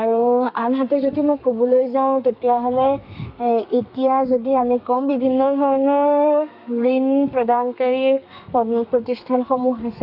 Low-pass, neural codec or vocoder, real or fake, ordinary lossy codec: 5.4 kHz; codec, 32 kHz, 1.9 kbps, SNAC; fake; none